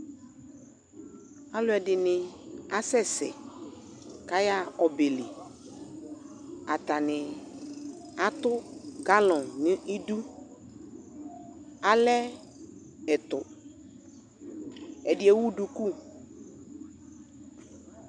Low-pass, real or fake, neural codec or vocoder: 9.9 kHz; real; none